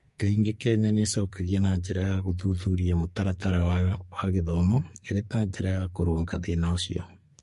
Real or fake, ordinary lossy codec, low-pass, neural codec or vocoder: fake; MP3, 48 kbps; 14.4 kHz; codec, 44.1 kHz, 2.6 kbps, SNAC